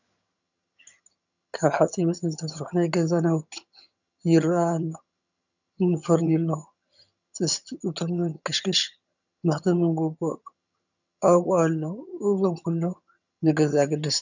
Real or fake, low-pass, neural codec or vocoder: fake; 7.2 kHz; vocoder, 22.05 kHz, 80 mel bands, HiFi-GAN